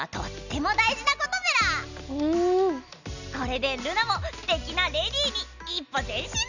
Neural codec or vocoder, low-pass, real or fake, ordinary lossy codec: none; 7.2 kHz; real; none